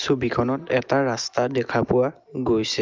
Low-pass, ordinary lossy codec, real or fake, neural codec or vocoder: 7.2 kHz; Opus, 24 kbps; real; none